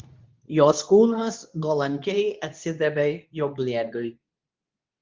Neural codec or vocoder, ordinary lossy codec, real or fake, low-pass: codec, 24 kHz, 0.9 kbps, WavTokenizer, medium speech release version 2; Opus, 24 kbps; fake; 7.2 kHz